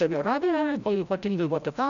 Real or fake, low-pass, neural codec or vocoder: fake; 7.2 kHz; codec, 16 kHz, 0.5 kbps, FreqCodec, larger model